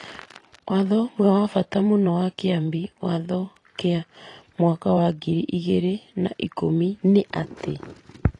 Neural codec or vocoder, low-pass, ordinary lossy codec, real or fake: vocoder, 44.1 kHz, 128 mel bands every 512 samples, BigVGAN v2; 10.8 kHz; AAC, 32 kbps; fake